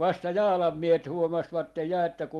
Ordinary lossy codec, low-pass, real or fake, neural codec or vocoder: Opus, 24 kbps; 19.8 kHz; real; none